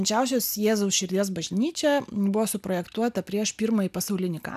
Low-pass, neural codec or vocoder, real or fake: 14.4 kHz; none; real